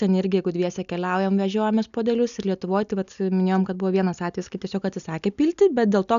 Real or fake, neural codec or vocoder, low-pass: real; none; 7.2 kHz